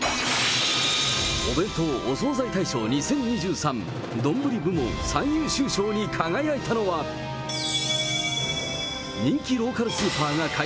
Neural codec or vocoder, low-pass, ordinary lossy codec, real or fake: none; none; none; real